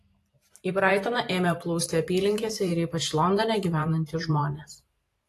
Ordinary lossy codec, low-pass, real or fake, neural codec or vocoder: AAC, 48 kbps; 14.4 kHz; fake; vocoder, 44.1 kHz, 128 mel bands every 512 samples, BigVGAN v2